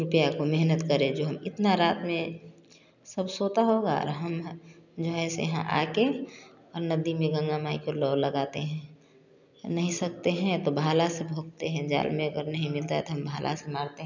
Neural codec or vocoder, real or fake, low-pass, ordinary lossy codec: none; real; 7.2 kHz; none